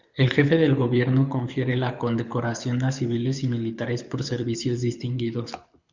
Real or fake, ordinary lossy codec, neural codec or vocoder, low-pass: fake; Opus, 64 kbps; codec, 24 kHz, 6 kbps, HILCodec; 7.2 kHz